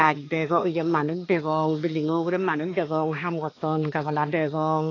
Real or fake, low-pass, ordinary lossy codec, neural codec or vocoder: fake; 7.2 kHz; AAC, 32 kbps; codec, 16 kHz, 2 kbps, X-Codec, HuBERT features, trained on balanced general audio